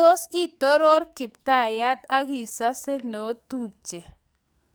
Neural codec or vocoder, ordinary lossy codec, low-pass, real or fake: codec, 44.1 kHz, 2.6 kbps, SNAC; none; none; fake